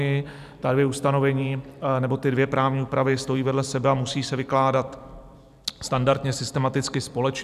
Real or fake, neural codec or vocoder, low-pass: fake; vocoder, 44.1 kHz, 128 mel bands every 256 samples, BigVGAN v2; 14.4 kHz